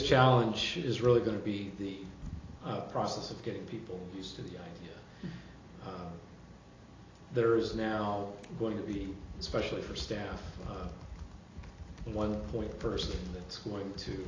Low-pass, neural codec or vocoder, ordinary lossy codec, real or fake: 7.2 kHz; none; AAC, 32 kbps; real